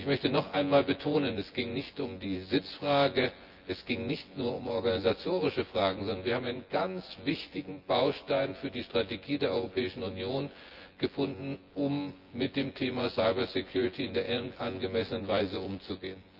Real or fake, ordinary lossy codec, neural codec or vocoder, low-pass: fake; Opus, 32 kbps; vocoder, 24 kHz, 100 mel bands, Vocos; 5.4 kHz